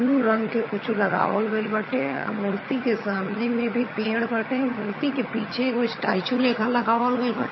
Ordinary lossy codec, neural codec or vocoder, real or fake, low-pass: MP3, 24 kbps; vocoder, 22.05 kHz, 80 mel bands, HiFi-GAN; fake; 7.2 kHz